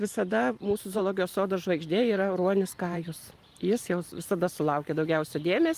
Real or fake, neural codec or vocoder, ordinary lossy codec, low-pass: fake; vocoder, 44.1 kHz, 128 mel bands every 512 samples, BigVGAN v2; Opus, 32 kbps; 14.4 kHz